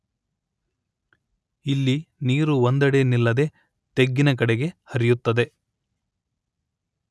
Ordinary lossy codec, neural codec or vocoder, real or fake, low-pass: none; none; real; none